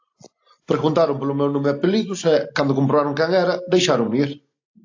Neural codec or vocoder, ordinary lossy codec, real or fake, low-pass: none; AAC, 48 kbps; real; 7.2 kHz